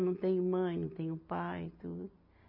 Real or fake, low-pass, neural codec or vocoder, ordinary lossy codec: real; 5.4 kHz; none; MP3, 48 kbps